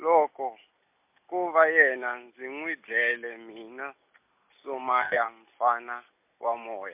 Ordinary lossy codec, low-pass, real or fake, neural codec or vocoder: MP3, 32 kbps; 3.6 kHz; real; none